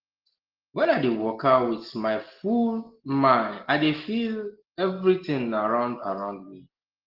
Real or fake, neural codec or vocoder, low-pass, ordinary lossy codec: real; none; 5.4 kHz; Opus, 16 kbps